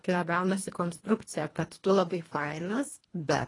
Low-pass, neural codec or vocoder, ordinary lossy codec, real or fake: 10.8 kHz; codec, 24 kHz, 1.5 kbps, HILCodec; AAC, 32 kbps; fake